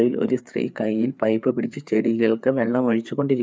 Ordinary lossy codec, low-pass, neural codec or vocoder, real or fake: none; none; codec, 16 kHz, 4 kbps, FreqCodec, larger model; fake